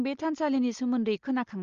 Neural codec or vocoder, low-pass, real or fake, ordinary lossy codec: none; 7.2 kHz; real; Opus, 32 kbps